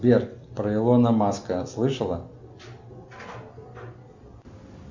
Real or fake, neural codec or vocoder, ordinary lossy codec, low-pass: real; none; MP3, 64 kbps; 7.2 kHz